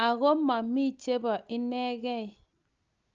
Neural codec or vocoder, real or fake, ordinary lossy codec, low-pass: none; real; Opus, 24 kbps; 7.2 kHz